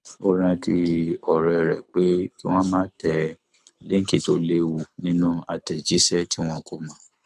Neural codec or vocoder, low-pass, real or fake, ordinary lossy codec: codec, 24 kHz, 6 kbps, HILCodec; none; fake; none